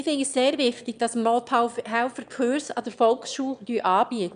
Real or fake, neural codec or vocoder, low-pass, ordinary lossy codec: fake; autoencoder, 22.05 kHz, a latent of 192 numbers a frame, VITS, trained on one speaker; 9.9 kHz; none